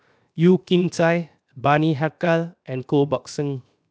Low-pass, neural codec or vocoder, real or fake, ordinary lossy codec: none; codec, 16 kHz, 0.7 kbps, FocalCodec; fake; none